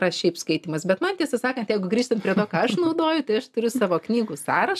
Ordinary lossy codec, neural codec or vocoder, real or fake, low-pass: AAC, 96 kbps; none; real; 14.4 kHz